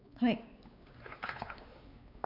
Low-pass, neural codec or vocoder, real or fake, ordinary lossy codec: 5.4 kHz; codec, 16 kHz, 4 kbps, X-Codec, WavLM features, trained on Multilingual LibriSpeech; fake; none